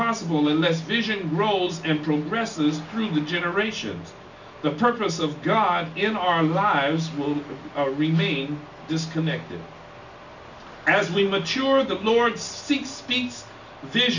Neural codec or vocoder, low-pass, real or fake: none; 7.2 kHz; real